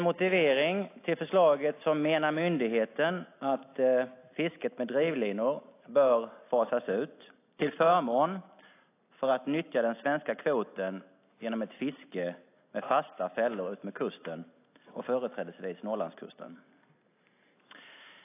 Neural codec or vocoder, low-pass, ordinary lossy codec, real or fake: none; 3.6 kHz; AAC, 24 kbps; real